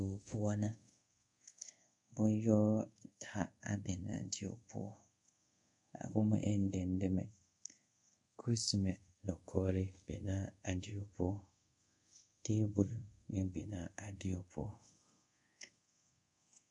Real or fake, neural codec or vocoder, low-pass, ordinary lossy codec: fake; codec, 24 kHz, 0.5 kbps, DualCodec; 10.8 kHz; MP3, 64 kbps